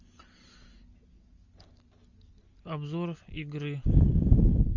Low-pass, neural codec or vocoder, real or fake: 7.2 kHz; none; real